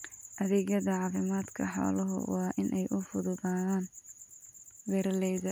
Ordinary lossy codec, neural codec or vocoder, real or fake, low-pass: none; none; real; none